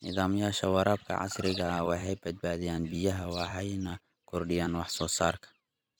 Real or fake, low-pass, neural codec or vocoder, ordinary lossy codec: fake; none; vocoder, 44.1 kHz, 128 mel bands every 256 samples, BigVGAN v2; none